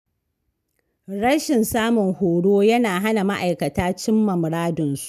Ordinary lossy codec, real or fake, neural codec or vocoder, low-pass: none; real; none; 14.4 kHz